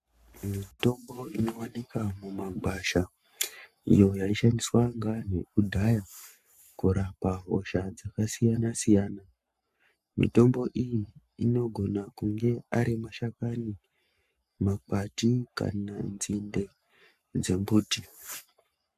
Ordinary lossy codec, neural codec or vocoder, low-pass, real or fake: MP3, 96 kbps; codec, 44.1 kHz, 7.8 kbps, Pupu-Codec; 14.4 kHz; fake